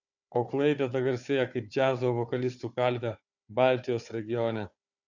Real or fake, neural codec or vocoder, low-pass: fake; codec, 16 kHz, 4 kbps, FunCodec, trained on Chinese and English, 50 frames a second; 7.2 kHz